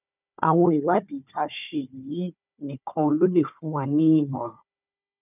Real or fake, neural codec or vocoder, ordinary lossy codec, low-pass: fake; codec, 16 kHz, 4 kbps, FunCodec, trained on Chinese and English, 50 frames a second; none; 3.6 kHz